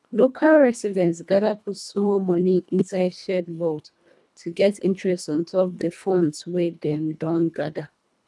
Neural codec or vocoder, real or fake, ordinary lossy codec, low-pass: codec, 24 kHz, 1.5 kbps, HILCodec; fake; none; none